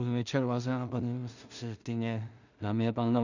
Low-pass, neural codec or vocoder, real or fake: 7.2 kHz; codec, 16 kHz in and 24 kHz out, 0.4 kbps, LongCat-Audio-Codec, two codebook decoder; fake